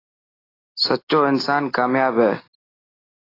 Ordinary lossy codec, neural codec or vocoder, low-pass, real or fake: AAC, 24 kbps; none; 5.4 kHz; real